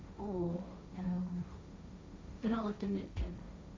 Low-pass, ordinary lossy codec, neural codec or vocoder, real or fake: none; none; codec, 16 kHz, 1.1 kbps, Voila-Tokenizer; fake